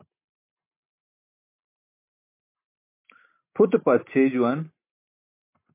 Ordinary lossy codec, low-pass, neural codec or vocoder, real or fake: MP3, 24 kbps; 3.6 kHz; none; real